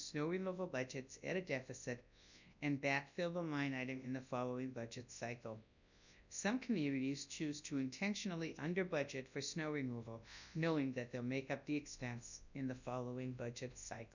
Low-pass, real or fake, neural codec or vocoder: 7.2 kHz; fake; codec, 24 kHz, 0.9 kbps, WavTokenizer, large speech release